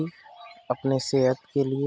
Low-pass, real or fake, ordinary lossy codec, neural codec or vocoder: none; real; none; none